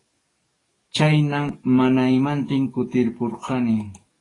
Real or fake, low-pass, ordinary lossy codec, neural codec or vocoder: fake; 10.8 kHz; AAC, 32 kbps; codec, 44.1 kHz, 7.8 kbps, DAC